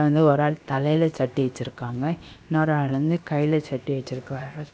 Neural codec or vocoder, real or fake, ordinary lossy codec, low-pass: codec, 16 kHz, about 1 kbps, DyCAST, with the encoder's durations; fake; none; none